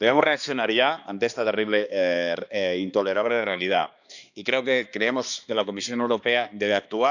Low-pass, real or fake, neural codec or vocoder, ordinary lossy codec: 7.2 kHz; fake; codec, 16 kHz, 2 kbps, X-Codec, HuBERT features, trained on balanced general audio; none